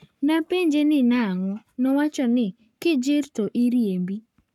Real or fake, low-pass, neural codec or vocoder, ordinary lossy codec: fake; 19.8 kHz; codec, 44.1 kHz, 7.8 kbps, Pupu-Codec; none